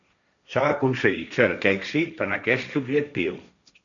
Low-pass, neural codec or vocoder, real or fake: 7.2 kHz; codec, 16 kHz, 1.1 kbps, Voila-Tokenizer; fake